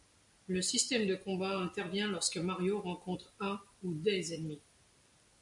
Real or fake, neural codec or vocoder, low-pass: real; none; 10.8 kHz